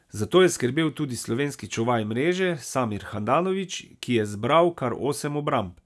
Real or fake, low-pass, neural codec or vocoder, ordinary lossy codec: real; none; none; none